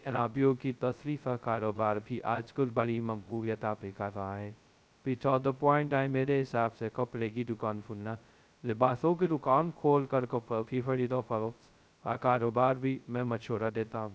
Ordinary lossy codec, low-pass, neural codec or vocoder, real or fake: none; none; codec, 16 kHz, 0.2 kbps, FocalCodec; fake